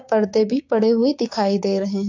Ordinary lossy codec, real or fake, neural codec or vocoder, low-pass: AAC, 48 kbps; fake; codec, 16 kHz, 6 kbps, DAC; 7.2 kHz